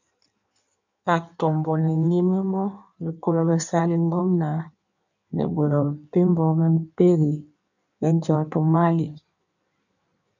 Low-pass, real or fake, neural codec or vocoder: 7.2 kHz; fake; codec, 16 kHz in and 24 kHz out, 1.1 kbps, FireRedTTS-2 codec